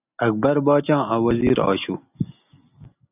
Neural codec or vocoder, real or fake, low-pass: none; real; 3.6 kHz